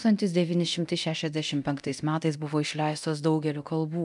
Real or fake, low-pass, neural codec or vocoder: fake; 10.8 kHz; codec, 24 kHz, 0.9 kbps, DualCodec